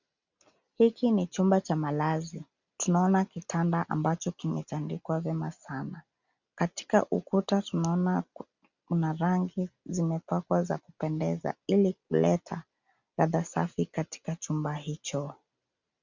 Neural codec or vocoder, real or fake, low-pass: none; real; 7.2 kHz